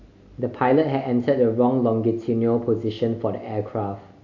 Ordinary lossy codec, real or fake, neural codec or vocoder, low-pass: AAC, 48 kbps; real; none; 7.2 kHz